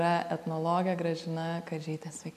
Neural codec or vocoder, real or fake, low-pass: none; real; 14.4 kHz